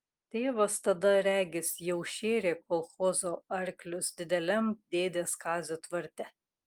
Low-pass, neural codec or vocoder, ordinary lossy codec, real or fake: 19.8 kHz; none; Opus, 32 kbps; real